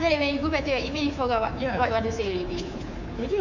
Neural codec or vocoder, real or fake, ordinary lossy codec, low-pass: codec, 24 kHz, 3.1 kbps, DualCodec; fake; none; 7.2 kHz